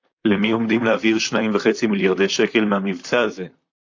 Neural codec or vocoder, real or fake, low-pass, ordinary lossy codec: vocoder, 44.1 kHz, 128 mel bands, Pupu-Vocoder; fake; 7.2 kHz; AAC, 48 kbps